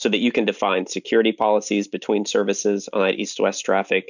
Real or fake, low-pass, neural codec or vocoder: real; 7.2 kHz; none